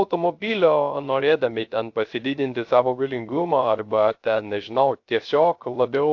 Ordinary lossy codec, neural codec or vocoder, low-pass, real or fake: AAC, 48 kbps; codec, 16 kHz, 0.3 kbps, FocalCodec; 7.2 kHz; fake